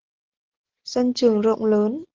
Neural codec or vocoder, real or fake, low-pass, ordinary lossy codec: none; real; 7.2 kHz; Opus, 16 kbps